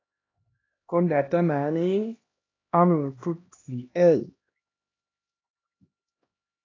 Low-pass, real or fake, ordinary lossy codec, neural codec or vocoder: 7.2 kHz; fake; AAC, 32 kbps; codec, 16 kHz, 1 kbps, X-Codec, HuBERT features, trained on LibriSpeech